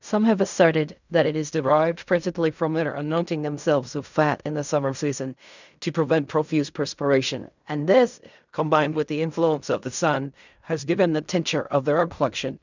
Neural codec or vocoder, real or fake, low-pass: codec, 16 kHz in and 24 kHz out, 0.4 kbps, LongCat-Audio-Codec, fine tuned four codebook decoder; fake; 7.2 kHz